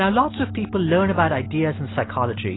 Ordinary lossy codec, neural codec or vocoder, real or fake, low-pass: AAC, 16 kbps; none; real; 7.2 kHz